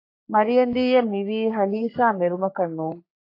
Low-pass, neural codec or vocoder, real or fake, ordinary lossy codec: 5.4 kHz; codec, 44.1 kHz, 3.4 kbps, Pupu-Codec; fake; AAC, 32 kbps